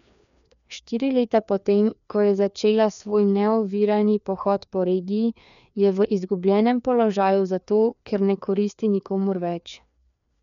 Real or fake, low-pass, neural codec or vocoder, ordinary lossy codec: fake; 7.2 kHz; codec, 16 kHz, 2 kbps, FreqCodec, larger model; none